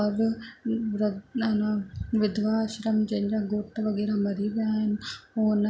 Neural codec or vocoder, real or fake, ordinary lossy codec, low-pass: none; real; none; none